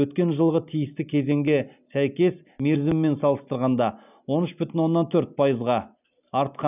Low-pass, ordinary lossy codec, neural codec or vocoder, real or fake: 3.6 kHz; none; none; real